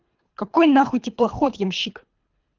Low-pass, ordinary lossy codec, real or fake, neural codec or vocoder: 7.2 kHz; Opus, 32 kbps; fake; codec, 24 kHz, 3 kbps, HILCodec